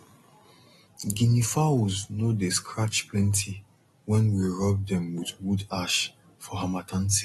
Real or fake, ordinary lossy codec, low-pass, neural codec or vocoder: real; AAC, 32 kbps; 19.8 kHz; none